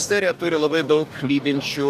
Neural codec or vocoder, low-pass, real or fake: codec, 44.1 kHz, 2.6 kbps, DAC; 14.4 kHz; fake